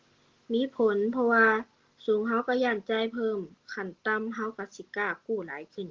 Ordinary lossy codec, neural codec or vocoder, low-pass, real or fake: Opus, 16 kbps; none; 7.2 kHz; real